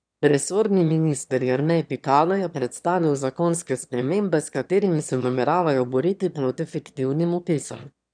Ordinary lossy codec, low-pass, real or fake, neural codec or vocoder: none; 9.9 kHz; fake; autoencoder, 22.05 kHz, a latent of 192 numbers a frame, VITS, trained on one speaker